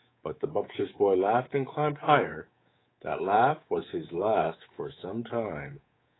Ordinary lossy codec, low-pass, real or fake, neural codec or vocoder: AAC, 16 kbps; 7.2 kHz; fake; codec, 24 kHz, 3.1 kbps, DualCodec